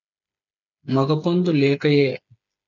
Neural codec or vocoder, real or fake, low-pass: codec, 16 kHz, 8 kbps, FreqCodec, smaller model; fake; 7.2 kHz